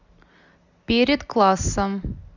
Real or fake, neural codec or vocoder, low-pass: real; none; 7.2 kHz